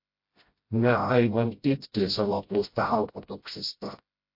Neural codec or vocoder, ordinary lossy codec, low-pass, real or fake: codec, 16 kHz, 0.5 kbps, FreqCodec, smaller model; MP3, 32 kbps; 5.4 kHz; fake